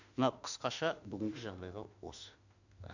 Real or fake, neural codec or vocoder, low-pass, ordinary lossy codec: fake; autoencoder, 48 kHz, 32 numbers a frame, DAC-VAE, trained on Japanese speech; 7.2 kHz; none